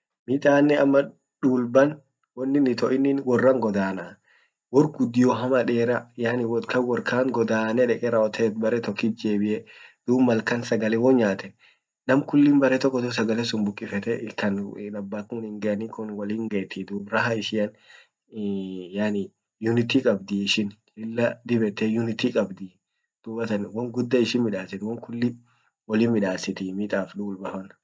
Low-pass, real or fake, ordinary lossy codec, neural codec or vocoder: none; real; none; none